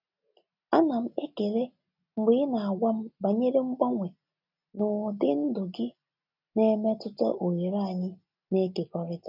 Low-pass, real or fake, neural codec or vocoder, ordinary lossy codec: 5.4 kHz; real; none; none